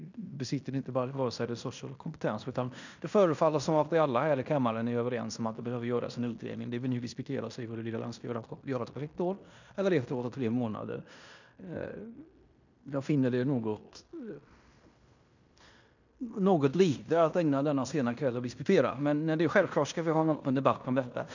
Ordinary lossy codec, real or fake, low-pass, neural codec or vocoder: none; fake; 7.2 kHz; codec, 16 kHz in and 24 kHz out, 0.9 kbps, LongCat-Audio-Codec, fine tuned four codebook decoder